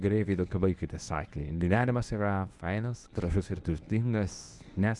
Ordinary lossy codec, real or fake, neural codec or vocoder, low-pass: Opus, 64 kbps; fake; codec, 24 kHz, 0.9 kbps, WavTokenizer, medium speech release version 1; 10.8 kHz